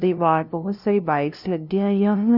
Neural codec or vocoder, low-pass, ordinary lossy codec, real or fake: codec, 16 kHz, 0.5 kbps, FunCodec, trained on LibriTTS, 25 frames a second; 5.4 kHz; none; fake